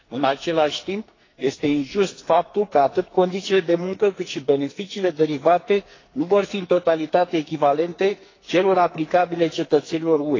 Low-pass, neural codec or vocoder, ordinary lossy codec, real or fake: 7.2 kHz; codec, 44.1 kHz, 2.6 kbps, SNAC; AAC, 32 kbps; fake